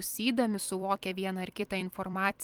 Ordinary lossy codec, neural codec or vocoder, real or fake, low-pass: Opus, 32 kbps; vocoder, 44.1 kHz, 128 mel bands every 256 samples, BigVGAN v2; fake; 19.8 kHz